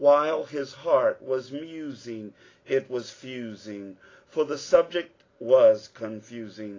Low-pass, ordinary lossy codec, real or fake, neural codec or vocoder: 7.2 kHz; AAC, 32 kbps; real; none